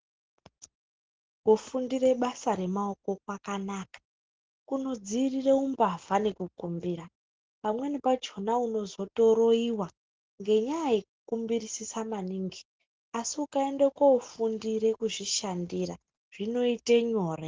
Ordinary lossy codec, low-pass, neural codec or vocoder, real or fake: Opus, 16 kbps; 7.2 kHz; none; real